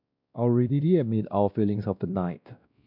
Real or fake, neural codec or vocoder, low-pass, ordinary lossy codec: fake; codec, 16 kHz, 1 kbps, X-Codec, WavLM features, trained on Multilingual LibriSpeech; 5.4 kHz; none